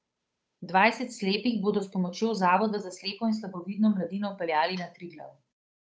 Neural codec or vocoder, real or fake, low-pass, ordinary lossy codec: codec, 16 kHz, 8 kbps, FunCodec, trained on Chinese and English, 25 frames a second; fake; none; none